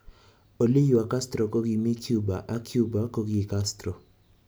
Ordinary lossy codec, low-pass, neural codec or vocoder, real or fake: none; none; none; real